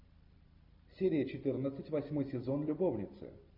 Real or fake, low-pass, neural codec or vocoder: real; 5.4 kHz; none